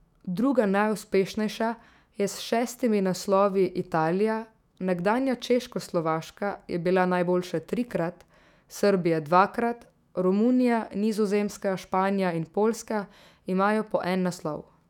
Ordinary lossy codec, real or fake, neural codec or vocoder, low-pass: none; fake; autoencoder, 48 kHz, 128 numbers a frame, DAC-VAE, trained on Japanese speech; 19.8 kHz